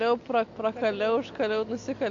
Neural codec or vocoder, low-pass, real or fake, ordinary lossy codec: none; 7.2 kHz; real; MP3, 48 kbps